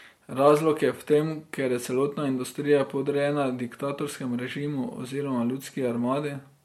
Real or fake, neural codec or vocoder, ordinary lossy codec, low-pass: real; none; MP3, 64 kbps; 19.8 kHz